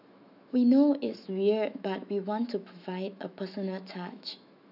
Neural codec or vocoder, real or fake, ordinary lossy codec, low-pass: autoencoder, 48 kHz, 128 numbers a frame, DAC-VAE, trained on Japanese speech; fake; none; 5.4 kHz